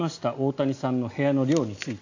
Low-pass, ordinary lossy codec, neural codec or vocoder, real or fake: 7.2 kHz; AAC, 48 kbps; none; real